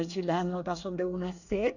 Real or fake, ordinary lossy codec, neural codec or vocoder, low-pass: fake; none; codec, 24 kHz, 1 kbps, SNAC; 7.2 kHz